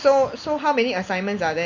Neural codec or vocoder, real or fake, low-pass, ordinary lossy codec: none; real; 7.2 kHz; Opus, 64 kbps